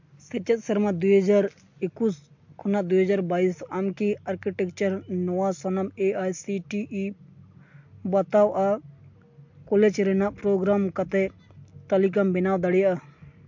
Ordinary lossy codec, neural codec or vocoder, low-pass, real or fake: MP3, 48 kbps; none; 7.2 kHz; real